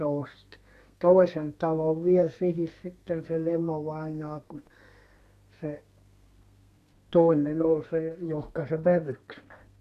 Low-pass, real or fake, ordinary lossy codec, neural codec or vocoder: 14.4 kHz; fake; none; codec, 32 kHz, 1.9 kbps, SNAC